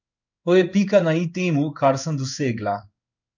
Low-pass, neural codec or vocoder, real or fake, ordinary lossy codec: 7.2 kHz; codec, 16 kHz in and 24 kHz out, 1 kbps, XY-Tokenizer; fake; none